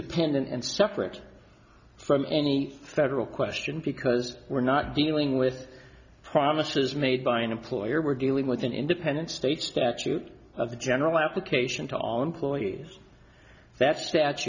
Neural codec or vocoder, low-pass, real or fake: none; 7.2 kHz; real